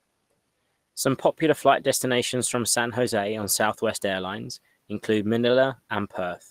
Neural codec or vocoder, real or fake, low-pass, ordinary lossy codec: none; real; 14.4 kHz; Opus, 24 kbps